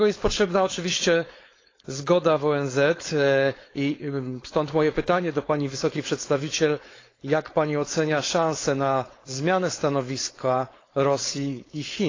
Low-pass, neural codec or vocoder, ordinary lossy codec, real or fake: 7.2 kHz; codec, 16 kHz, 4.8 kbps, FACodec; AAC, 32 kbps; fake